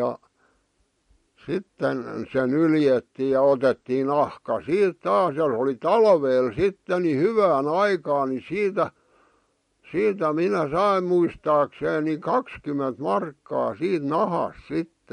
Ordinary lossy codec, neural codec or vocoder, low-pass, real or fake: MP3, 48 kbps; none; 19.8 kHz; real